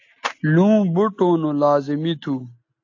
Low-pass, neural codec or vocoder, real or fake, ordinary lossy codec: 7.2 kHz; none; real; AAC, 48 kbps